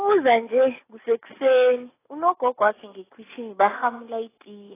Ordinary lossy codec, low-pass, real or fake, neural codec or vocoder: AAC, 16 kbps; 3.6 kHz; real; none